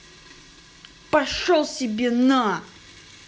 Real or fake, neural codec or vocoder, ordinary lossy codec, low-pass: real; none; none; none